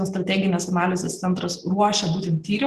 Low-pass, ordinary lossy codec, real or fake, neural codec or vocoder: 14.4 kHz; Opus, 16 kbps; real; none